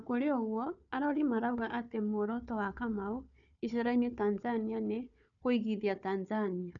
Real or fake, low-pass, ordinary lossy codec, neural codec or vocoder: fake; 7.2 kHz; none; codec, 16 kHz, 4 kbps, FunCodec, trained on Chinese and English, 50 frames a second